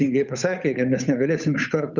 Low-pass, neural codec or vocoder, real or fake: 7.2 kHz; codec, 16 kHz, 8 kbps, FunCodec, trained on Chinese and English, 25 frames a second; fake